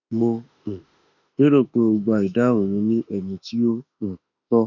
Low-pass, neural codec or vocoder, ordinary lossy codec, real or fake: 7.2 kHz; autoencoder, 48 kHz, 32 numbers a frame, DAC-VAE, trained on Japanese speech; none; fake